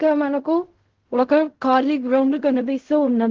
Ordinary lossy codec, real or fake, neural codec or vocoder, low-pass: Opus, 16 kbps; fake; codec, 16 kHz in and 24 kHz out, 0.4 kbps, LongCat-Audio-Codec, fine tuned four codebook decoder; 7.2 kHz